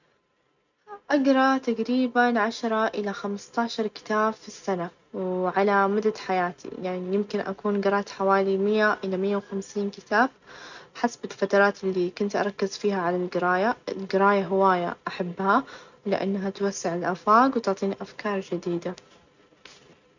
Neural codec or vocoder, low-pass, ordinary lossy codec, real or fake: none; 7.2 kHz; none; real